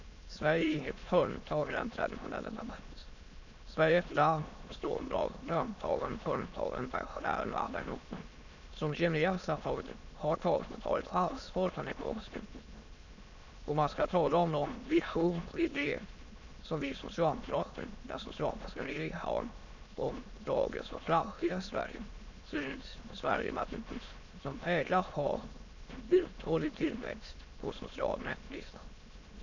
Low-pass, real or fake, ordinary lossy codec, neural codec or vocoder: 7.2 kHz; fake; none; autoencoder, 22.05 kHz, a latent of 192 numbers a frame, VITS, trained on many speakers